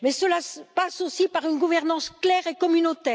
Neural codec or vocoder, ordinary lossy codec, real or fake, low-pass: none; none; real; none